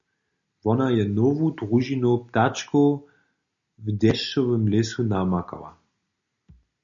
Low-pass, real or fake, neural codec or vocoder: 7.2 kHz; real; none